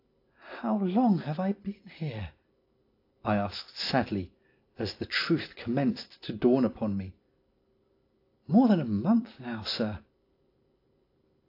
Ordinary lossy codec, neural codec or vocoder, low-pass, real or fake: AAC, 32 kbps; none; 5.4 kHz; real